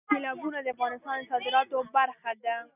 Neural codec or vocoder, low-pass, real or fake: none; 3.6 kHz; real